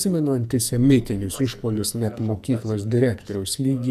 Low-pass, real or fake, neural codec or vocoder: 14.4 kHz; fake; codec, 44.1 kHz, 2.6 kbps, SNAC